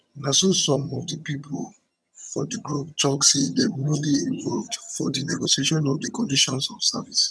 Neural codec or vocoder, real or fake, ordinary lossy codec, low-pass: vocoder, 22.05 kHz, 80 mel bands, HiFi-GAN; fake; none; none